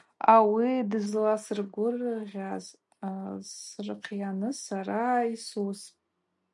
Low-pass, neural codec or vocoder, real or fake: 10.8 kHz; none; real